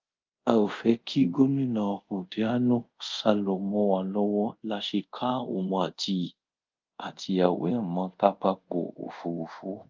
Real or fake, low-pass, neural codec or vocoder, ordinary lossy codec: fake; 7.2 kHz; codec, 24 kHz, 0.5 kbps, DualCodec; Opus, 32 kbps